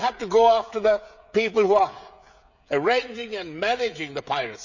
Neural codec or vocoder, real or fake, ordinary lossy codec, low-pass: codec, 16 kHz, 16 kbps, FreqCodec, smaller model; fake; none; 7.2 kHz